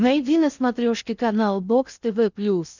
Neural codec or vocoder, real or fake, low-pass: codec, 16 kHz in and 24 kHz out, 0.6 kbps, FocalCodec, streaming, 4096 codes; fake; 7.2 kHz